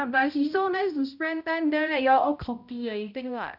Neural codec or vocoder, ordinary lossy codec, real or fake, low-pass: codec, 16 kHz, 0.5 kbps, X-Codec, HuBERT features, trained on balanced general audio; none; fake; 5.4 kHz